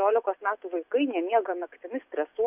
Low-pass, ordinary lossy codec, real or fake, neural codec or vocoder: 3.6 kHz; AAC, 32 kbps; real; none